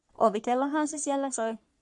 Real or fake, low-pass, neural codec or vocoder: fake; 10.8 kHz; codec, 44.1 kHz, 3.4 kbps, Pupu-Codec